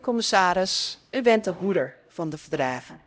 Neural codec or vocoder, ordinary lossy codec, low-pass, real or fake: codec, 16 kHz, 0.5 kbps, X-Codec, HuBERT features, trained on LibriSpeech; none; none; fake